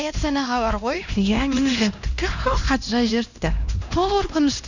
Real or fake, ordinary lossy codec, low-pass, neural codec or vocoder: fake; none; 7.2 kHz; codec, 16 kHz, 1 kbps, X-Codec, HuBERT features, trained on LibriSpeech